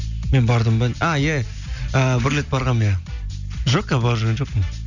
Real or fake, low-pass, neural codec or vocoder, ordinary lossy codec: real; 7.2 kHz; none; none